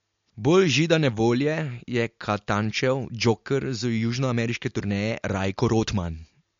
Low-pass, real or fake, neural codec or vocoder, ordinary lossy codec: 7.2 kHz; real; none; MP3, 48 kbps